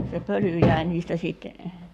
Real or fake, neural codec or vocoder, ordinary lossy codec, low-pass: fake; codec, 44.1 kHz, 7.8 kbps, Pupu-Codec; none; 14.4 kHz